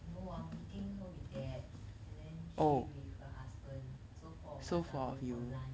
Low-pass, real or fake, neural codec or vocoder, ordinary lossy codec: none; real; none; none